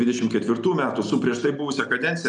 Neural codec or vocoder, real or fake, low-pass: none; real; 10.8 kHz